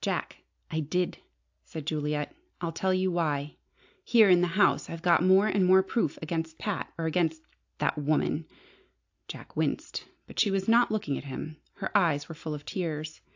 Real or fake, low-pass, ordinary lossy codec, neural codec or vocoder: real; 7.2 kHz; AAC, 48 kbps; none